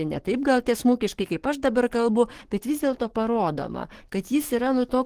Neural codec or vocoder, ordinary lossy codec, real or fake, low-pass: codec, 44.1 kHz, 7.8 kbps, DAC; Opus, 16 kbps; fake; 14.4 kHz